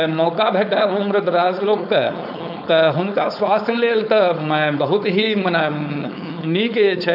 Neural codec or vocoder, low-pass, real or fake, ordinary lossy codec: codec, 16 kHz, 4.8 kbps, FACodec; 5.4 kHz; fake; none